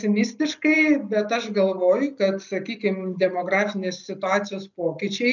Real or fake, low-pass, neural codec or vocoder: real; 7.2 kHz; none